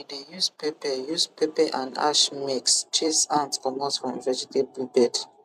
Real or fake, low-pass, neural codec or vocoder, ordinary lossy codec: fake; 14.4 kHz; vocoder, 44.1 kHz, 128 mel bands every 256 samples, BigVGAN v2; none